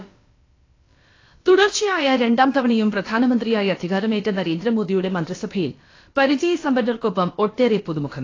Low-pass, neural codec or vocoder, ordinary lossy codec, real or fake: 7.2 kHz; codec, 16 kHz, about 1 kbps, DyCAST, with the encoder's durations; AAC, 32 kbps; fake